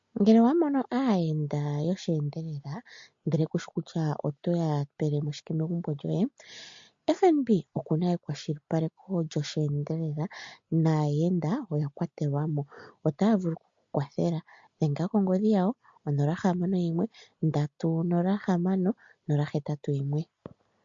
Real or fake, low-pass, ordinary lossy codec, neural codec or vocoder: real; 7.2 kHz; MP3, 48 kbps; none